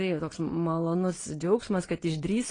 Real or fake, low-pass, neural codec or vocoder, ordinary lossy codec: fake; 9.9 kHz; vocoder, 22.05 kHz, 80 mel bands, Vocos; AAC, 32 kbps